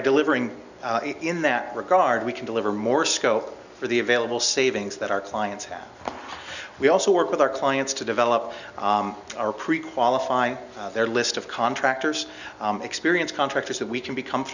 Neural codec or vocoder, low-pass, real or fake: none; 7.2 kHz; real